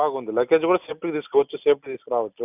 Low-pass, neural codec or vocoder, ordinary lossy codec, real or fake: 3.6 kHz; none; none; real